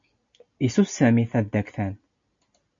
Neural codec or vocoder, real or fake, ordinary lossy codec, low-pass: none; real; MP3, 48 kbps; 7.2 kHz